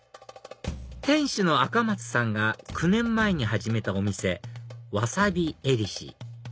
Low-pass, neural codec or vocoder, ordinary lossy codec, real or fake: none; none; none; real